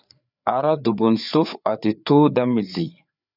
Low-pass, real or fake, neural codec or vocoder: 5.4 kHz; fake; codec, 16 kHz, 4 kbps, FreqCodec, larger model